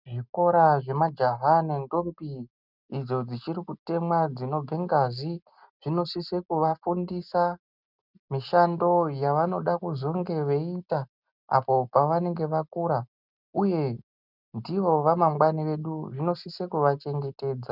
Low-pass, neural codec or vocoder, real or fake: 5.4 kHz; none; real